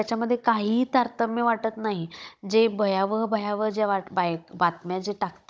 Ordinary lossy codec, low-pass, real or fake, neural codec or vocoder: none; none; fake; codec, 16 kHz, 16 kbps, FunCodec, trained on Chinese and English, 50 frames a second